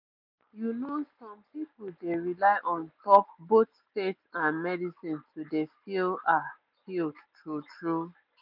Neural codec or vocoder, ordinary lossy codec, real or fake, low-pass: none; none; real; 5.4 kHz